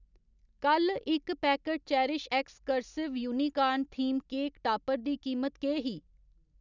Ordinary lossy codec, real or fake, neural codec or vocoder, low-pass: none; real; none; 7.2 kHz